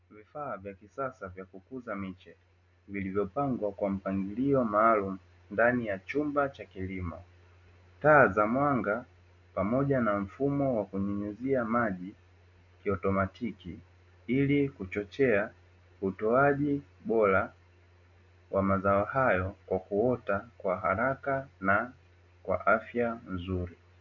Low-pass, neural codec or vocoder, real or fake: 7.2 kHz; none; real